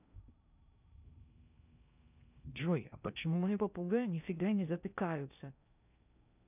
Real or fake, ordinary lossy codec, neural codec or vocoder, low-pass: fake; none; codec, 16 kHz in and 24 kHz out, 0.6 kbps, FocalCodec, streaming, 4096 codes; 3.6 kHz